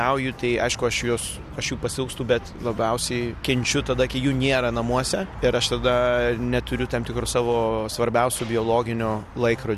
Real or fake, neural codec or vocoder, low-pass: real; none; 14.4 kHz